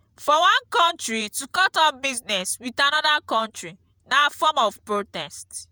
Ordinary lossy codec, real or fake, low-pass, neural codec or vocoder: none; fake; none; vocoder, 48 kHz, 128 mel bands, Vocos